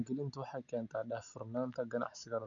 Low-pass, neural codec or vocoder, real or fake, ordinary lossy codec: 7.2 kHz; none; real; none